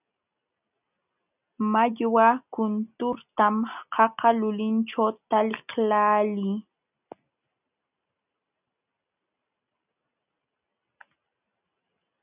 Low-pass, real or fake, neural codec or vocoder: 3.6 kHz; real; none